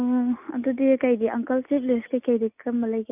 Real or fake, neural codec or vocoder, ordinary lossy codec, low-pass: real; none; none; 3.6 kHz